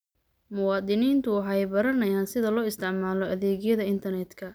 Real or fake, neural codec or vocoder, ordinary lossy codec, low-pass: real; none; none; none